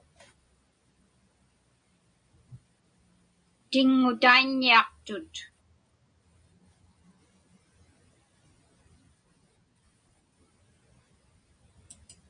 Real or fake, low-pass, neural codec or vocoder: real; 9.9 kHz; none